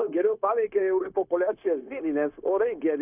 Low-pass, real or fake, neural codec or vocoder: 3.6 kHz; fake; codec, 16 kHz, 0.9 kbps, LongCat-Audio-Codec